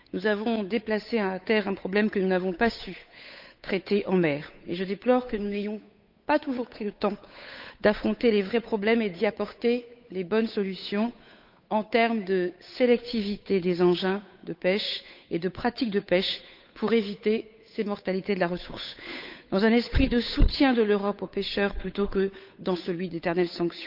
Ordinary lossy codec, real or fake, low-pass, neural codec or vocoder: none; fake; 5.4 kHz; codec, 16 kHz, 8 kbps, FunCodec, trained on Chinese and English, 25 frames a second